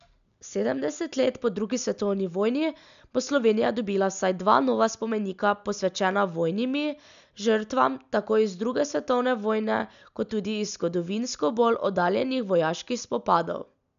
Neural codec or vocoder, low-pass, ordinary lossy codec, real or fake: none; 7.2 kHz; none; real